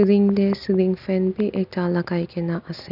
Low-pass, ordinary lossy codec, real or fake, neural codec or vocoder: 5.4 kHz; none; real; none